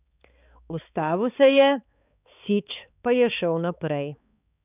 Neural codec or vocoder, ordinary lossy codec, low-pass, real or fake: codec, 16 kHz, 4 kbps, X-Codec, HuBERT features, trained on balanced general audio; none; 3.6 kHz; fake